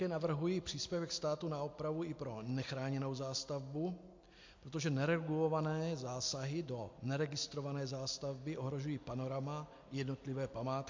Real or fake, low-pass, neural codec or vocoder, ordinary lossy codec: real; 7.2 kHz; none; MP3, 48 kbps